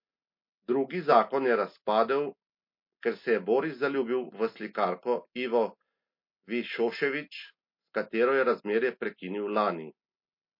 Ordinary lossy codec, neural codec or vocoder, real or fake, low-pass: MP3, 32 kbps; none; real; 5.4 kHz